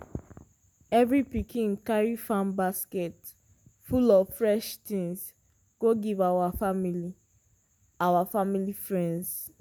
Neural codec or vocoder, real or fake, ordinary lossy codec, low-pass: none; real; none; none